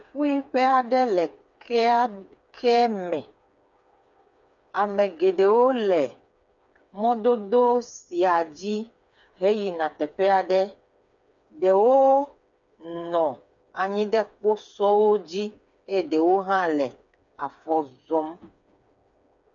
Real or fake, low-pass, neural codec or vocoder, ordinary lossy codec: fake; 7.2 kHz; codec, 16 kHz, 4 kbps, FreqCodec, smaller model; MP3, 64 kbps